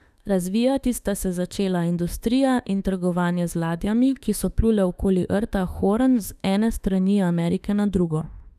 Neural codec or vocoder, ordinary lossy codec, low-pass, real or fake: autoencoder, 48 kHz, 32 numbers a frame, DAC-VAE, trained on Japanese speech; none; 14.4 kHz; fake